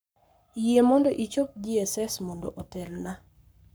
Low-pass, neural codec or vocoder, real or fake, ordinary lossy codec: none; codec, 44.1 kHz, 7.8 kbps, Pupu-Codec; fake; none